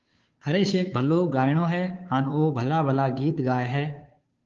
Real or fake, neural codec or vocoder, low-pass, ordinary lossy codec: fake; codec, 16 kHz, 2 kbps, FunCodec, trained on Chinese and English, 25 frames a second; 7.2 kHz; Opus, 32 kbps